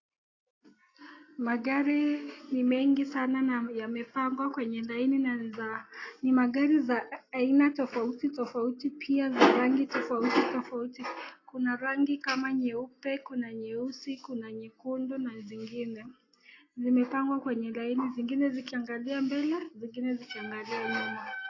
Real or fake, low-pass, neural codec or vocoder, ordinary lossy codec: real; 7.2 kHz; none; AAC, 32 kbps